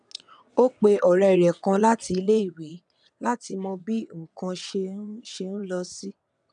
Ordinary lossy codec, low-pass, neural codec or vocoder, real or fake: none; 9.9 kHz; vocoder, 22.05 kHz, 80 mel bands, WaveNeXt; fake